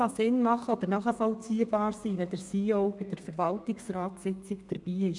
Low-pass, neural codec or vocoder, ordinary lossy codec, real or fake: 10.8 kHz; codec, 32 kHz, 1.9 kbps, SNAC; MP3, 96 kbps; fake